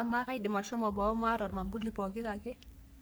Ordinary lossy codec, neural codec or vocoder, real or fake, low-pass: none; codec, 44.1 kHz, 3.4 kbps, Pupu-Codec; fake; none